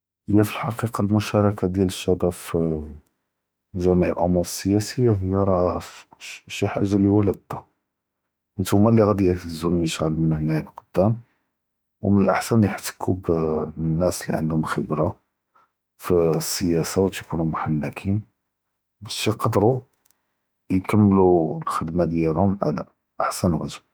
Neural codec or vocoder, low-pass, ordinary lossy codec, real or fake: autoencoder, 48 kHz, 32 numbers a frame, DAC-VAE, trained on Japanese speech; none; none; fake